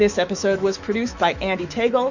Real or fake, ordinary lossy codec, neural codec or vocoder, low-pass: fake; Opus, 64 kbps; autoencoder, 48 kHz, 128 numbers a frame, DAC-VAE, trained on Japanese speech; 7.2 kHz